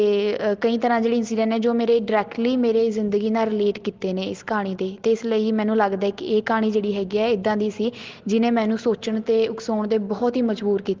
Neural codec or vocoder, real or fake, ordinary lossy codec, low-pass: none; real; Opus, 16 kbps; 7.2 kHz